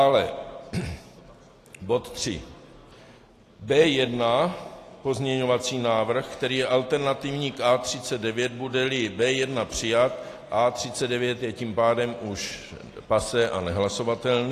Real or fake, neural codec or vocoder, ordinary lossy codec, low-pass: real; none; AAC, 48 kbps; 14.4 kHz